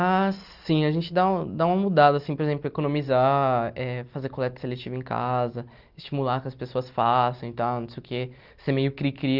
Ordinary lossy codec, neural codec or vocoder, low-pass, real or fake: Opus, 24 kbps; none; 5.4 kHz; real